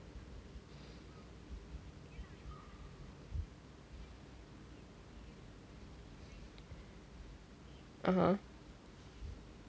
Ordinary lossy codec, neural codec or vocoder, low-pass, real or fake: none; none; none; real